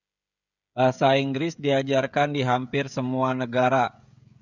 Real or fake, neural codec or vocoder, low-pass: fake; codec, 16 kHz, 16 kbps, FreqCodec, smaller model; 7.2 kHz